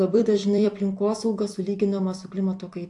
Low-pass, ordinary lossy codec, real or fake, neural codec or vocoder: 10.8 kHz; AAC, 64 kbps; fake; vocoder, 44.1 kHz, 128 mel bands every 256 samples, BigVGAN v2